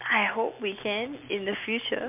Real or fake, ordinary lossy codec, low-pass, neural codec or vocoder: real; MP3, 32 kbps; 3.6 kHz; none